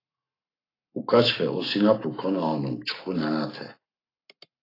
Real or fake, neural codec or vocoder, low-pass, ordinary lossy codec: fake; codec, 44.1 kHz, 7.8 kbps, Pupu-Codec; 5.4 kHz; AAC, 24 kbps